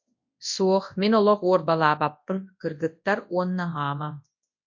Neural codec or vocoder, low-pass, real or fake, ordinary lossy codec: codec, 24 kHz, 0.9 kbps, WavTokenizer, large speech release; 7.2 kHz; fake; MP3, 48 kbps